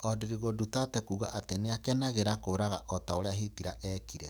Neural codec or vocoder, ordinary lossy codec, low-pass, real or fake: codec, 44.1 kHz, 7.8 kbps, DAC; none; none; fake